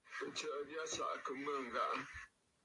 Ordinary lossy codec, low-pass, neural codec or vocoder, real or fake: AAC, 48 kbps; 10.8 kHz; vocoder, 44.1 kHz, 128 mel bands every 256 samples, BigVGAN v2; fake